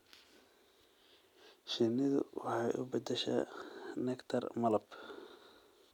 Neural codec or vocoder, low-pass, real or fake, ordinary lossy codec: none; 19.8 kHz; real; none